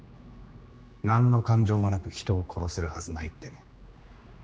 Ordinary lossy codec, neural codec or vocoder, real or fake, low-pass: none; codec, 16 kHz, 2 kbps, X-Codec, HuBERT features, trained on general audio; fake; none